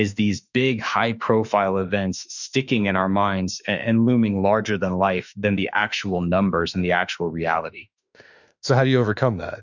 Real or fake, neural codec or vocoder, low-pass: fake; autoencoder, 48 kHz, 32 numbers a frame, DAC-VAE, trained on Japanese speech; 7.2 kHz